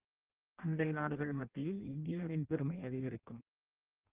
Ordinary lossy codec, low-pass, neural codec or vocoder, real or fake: Opus, 32 kbps; 3.6 kHz; codec, 16 kHz in and 24 kHz out, 0.6 kbps, FireRedTTS-2 codec; fake